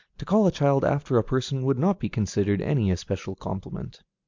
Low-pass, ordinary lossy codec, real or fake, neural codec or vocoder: 7.2 kHz; MP3, 64 kbps; real; none